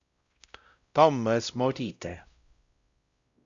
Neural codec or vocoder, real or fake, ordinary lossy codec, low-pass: codec, 16 kHz, 0.5 kbps, X-Codec, WavLM features, trained on Multilingual LibriSpeech; fake; Opus, 64 kbps; 7.2 kHz